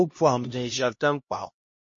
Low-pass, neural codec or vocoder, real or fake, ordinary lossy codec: 7.2 kHz; codec, 16 kHz, 1 kbps, X-Codec, HuBERT features, trained on LibriSpeech; fake; MP3, 32 kbps